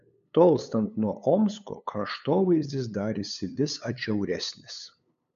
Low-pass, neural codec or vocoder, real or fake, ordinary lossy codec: 7.2 kHz; codec, 16 kHz, 8 kbps, FunCodec, trained on LibriTTS, 25 frames a second; fake; MP3, 64 kbps